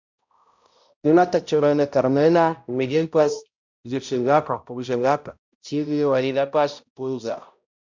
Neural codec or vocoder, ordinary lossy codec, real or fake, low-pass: codec, 16 kHz, 0.5 kbps, X-Codec, HuBERT features, trained on balanced general audio; MP3, 64 kbps; fake; 7.2 kHz